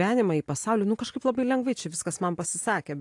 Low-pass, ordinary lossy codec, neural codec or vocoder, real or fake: 10.8 kHz; AAC, 64 kbps; none; real